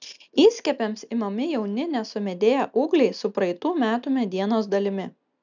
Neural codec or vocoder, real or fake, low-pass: none; real; 7.2 kHz